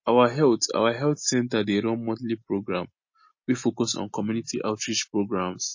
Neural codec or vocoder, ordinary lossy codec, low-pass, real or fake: none; MP3, 32 kbps; 7.2 kHz; real